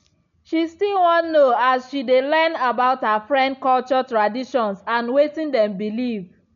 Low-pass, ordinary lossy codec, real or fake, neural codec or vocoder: 7.2 kHz; none; real; none